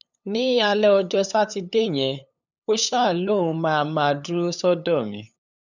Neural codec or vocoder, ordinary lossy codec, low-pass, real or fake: codec, 16 kHz, 8 kbps, FunCodec, trained on LibriTTS, 25 frames a second; none; 7.2 kHz; fake